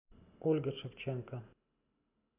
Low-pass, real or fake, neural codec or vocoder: 3.6 kHz; real; none